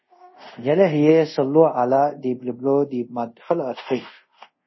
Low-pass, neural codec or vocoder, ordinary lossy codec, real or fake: 7.2 kHz; codec, 24 kHz, 0.5 kbps, DualCodec; MP3, 24 kbps; fake